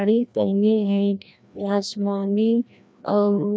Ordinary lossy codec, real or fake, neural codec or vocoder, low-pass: none; fake; codec, 16 kHz, 1 kbps, FreqCodec, larger model; none